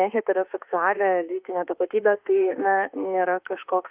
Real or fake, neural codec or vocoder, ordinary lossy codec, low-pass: fake; autoencoder, 48 kHz, 32 numbers a frame, DAC-VAE, trained on Japanese speech; Opus, 24 kbps; 3.6 kHz